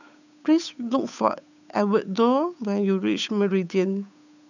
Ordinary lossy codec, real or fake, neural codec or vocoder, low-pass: none; fake; codec, 16 kHz, 8 kbps, FunCodec, trained on Chinese and English, 25 frames a second; 7.2 kHz